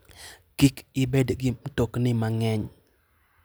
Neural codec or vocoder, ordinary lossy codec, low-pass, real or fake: none; none; none; real